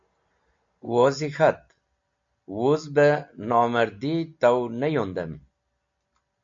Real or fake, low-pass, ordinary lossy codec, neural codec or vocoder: real; 7.2 kHz; MP3, 48 kbps; none